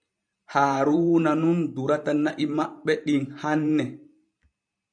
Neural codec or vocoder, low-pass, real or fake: vocoder, 44.1 kHz, 128 mel bands every 512 samples, BigVGAN v2; 9.9 kHz; fake